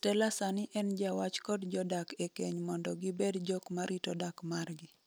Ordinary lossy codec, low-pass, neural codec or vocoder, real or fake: none; none; none; real